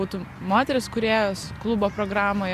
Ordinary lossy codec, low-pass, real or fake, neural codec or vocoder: Opus, 64 kbps; 14.4 kHz; real; none